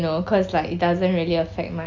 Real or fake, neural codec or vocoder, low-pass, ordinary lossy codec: real; none; 7.2 kHz; Opus, 64 kbps